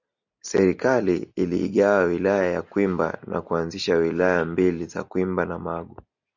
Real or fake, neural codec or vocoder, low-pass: real; none; 7.2 kHz